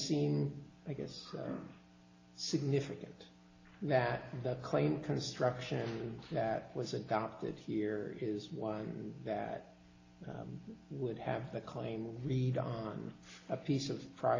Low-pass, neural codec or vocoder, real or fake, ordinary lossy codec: 7.2 kHz; none; real; AAC, 32 kbps